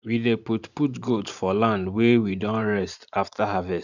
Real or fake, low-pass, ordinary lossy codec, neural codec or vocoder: fake; 7.2 kHz; none; vocoder, 44.1 kHz, 128 mel bands every 512 samples, BigVGAN v2